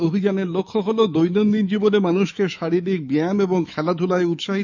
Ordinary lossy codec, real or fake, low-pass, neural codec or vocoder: none; fake; 7.2 kHz; autoencoder, 48 kHz, 128 numbers a frame, DAC-VAE, trained on Japanese speech